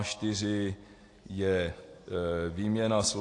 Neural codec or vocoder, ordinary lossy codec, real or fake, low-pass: none; AAC, 48 kbps; real; 10.8 kHz